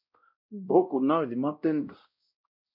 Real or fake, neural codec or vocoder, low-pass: fake; codec, 16 kHz, 0.5 kbps, X-Codec, WavLM features, trained on Multilingual LibriSpeech; 5.4 kHz